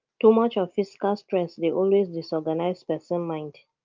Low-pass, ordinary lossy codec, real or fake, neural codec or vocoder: 7.2 kHz; Opus, 32 kbps; real; none